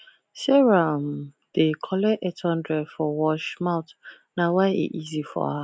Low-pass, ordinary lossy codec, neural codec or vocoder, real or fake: none; none; none; real